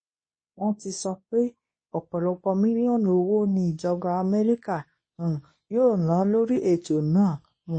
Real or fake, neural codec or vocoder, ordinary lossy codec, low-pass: fake; codec, 24 kHz, 0.9 kbps, WavTokenizer, medium speech release version 2; MP3, 32 kbps; 9.9 kHz